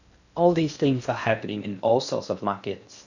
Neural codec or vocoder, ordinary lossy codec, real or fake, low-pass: codec, 16 kHz in and 24 kHz out, 0.6 kbps, FocalCodec, streaming, 4096 codes; none; fake; 7.2 kHz